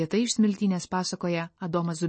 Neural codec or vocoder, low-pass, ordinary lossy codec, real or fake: none; 9.9 kHz; MP3, 32 kbps; real